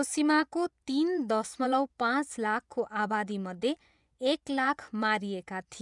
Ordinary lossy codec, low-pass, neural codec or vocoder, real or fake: none; 10.8 kHz; vocoder, 24 kHz, 100 mel bands, Vocos; fake